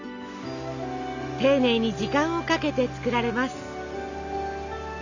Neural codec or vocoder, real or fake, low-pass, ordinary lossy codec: none; real; 7.2 kHz; none